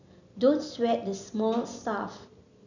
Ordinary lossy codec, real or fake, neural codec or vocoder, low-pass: none; real; none; 7.2 kHz